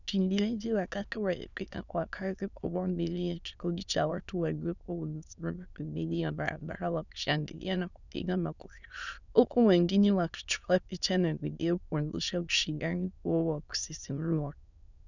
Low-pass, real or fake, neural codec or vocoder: 7.2 kHz; fake; autoencoder, 22.05 kHz, a latent of 192 numbers a frame, VITS, trained on many speakers